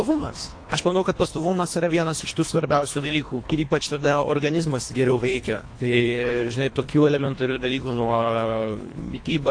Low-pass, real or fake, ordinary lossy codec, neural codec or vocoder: 9.9 kHz; fake; AAC, 48 kbps; codec, 24 kHz, 1.5 kbps, HILCodec